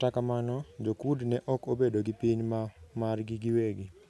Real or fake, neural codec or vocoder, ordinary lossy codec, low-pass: real; none; none; none